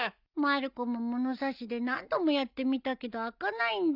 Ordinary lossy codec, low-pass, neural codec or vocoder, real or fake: none; 5.4 kHz; none; real